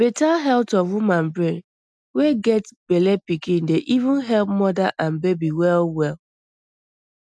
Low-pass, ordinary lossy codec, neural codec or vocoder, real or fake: none; none; none; real